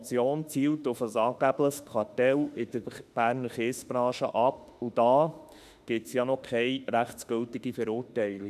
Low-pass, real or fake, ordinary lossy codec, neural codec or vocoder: 14.4 kHz; fake; none; autoencoder, 48 kHz, 32 numbers a frame, DAC-VAE, trained on Japanese speech